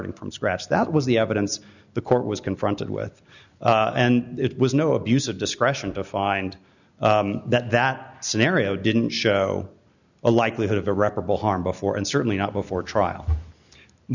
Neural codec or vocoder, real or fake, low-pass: none; real; 7.2 kHz